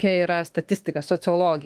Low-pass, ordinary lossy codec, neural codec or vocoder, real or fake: 14.4 kHz; Opus, 24 kbps; autoencoder, 48 kHz, 32 numbers a frame, DAC-VAE, trained on Japanese speech; fake